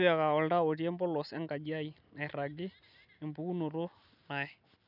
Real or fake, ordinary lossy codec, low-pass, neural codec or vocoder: real; none; 5.4 kHz; none